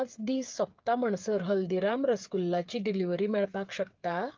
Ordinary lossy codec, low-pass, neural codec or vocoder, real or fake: Opus, 32 kbps; 7.2 kHz; codec, 16 kHz, 8 kbps, FreqCodec, smaller model; fake